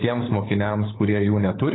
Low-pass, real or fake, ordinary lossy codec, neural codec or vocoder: 7.2 kHz; fake; AAC, 16 kbps; codec, 16 kHz, 16 kbps, FunCodec, trained on LibriTTS, 50 frames a second